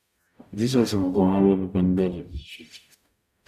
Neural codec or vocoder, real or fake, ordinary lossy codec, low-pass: codec, 44.1 kHz, 0.9 kbps, DAC; fake; none; 14.4 kHz